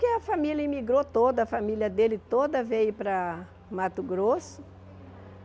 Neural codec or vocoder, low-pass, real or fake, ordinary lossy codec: none; none; real; none